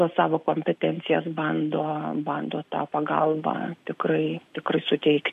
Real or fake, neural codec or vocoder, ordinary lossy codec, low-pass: real; none; MP3, 64 kbps; 14.4 kHz